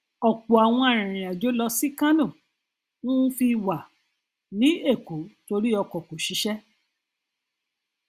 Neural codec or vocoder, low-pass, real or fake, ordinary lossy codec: none; 14.4 kHz; real; Opus, 64 kbps